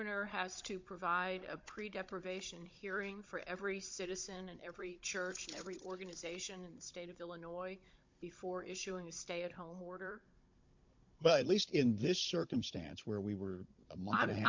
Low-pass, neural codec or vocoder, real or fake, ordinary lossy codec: 7.2 kHz; codec, 16 kHz, 16 kbps, FunCodec, trained on Chinese and English, 50 frames a second; fake; MP3, 48 kbps